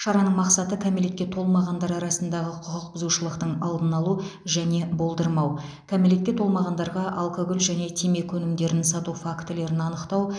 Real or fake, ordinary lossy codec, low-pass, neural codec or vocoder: real; none; 9.9 kHz; none